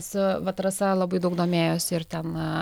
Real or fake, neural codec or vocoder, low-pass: real; none; 19.8 kHz